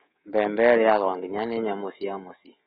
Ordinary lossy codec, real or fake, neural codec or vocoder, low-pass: AAC, 16 kbps; fake; codec, 24 kHz, 3.1 kbps, DualCodec; 10.8 kHz